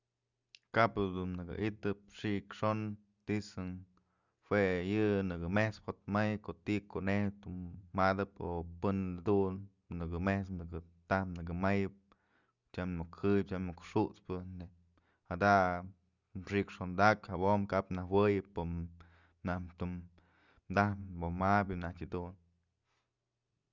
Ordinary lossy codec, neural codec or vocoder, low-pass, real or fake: Opus, 64 kbps; none; 7.2 kHz; real